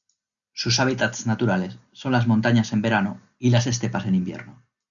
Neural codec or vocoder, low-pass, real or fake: none; 7.2 kHz; real